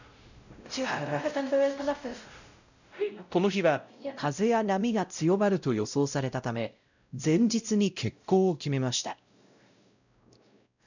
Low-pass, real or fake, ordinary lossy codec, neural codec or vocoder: 7.2 kHz; fake; none; codec, 16 kHz, 0.5 kbps, X-Codec, WavLM features, trained on Multilingual LibriSpeech